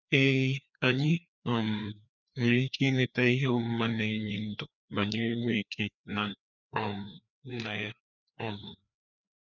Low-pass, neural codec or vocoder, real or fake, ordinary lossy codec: 7.2 kHz; codec, 16 kHz, 2 kbps, FreqCodec, larger model; fake; none